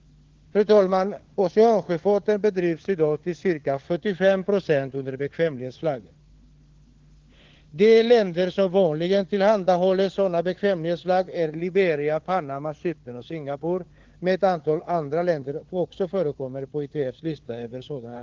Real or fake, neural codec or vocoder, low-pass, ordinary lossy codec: fake; codec, 16 kHz, 4 kbps, FunCodec, trained on LibriTTS, 50 frames a second; 7.2 kHz; Opus, 16 kbps